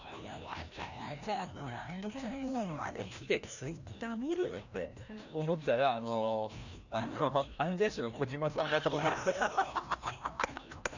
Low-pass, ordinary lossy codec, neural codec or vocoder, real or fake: 7.2 kHz; none; codec, 16 kHz, 1 kbps, FreqCodec, larger model; fake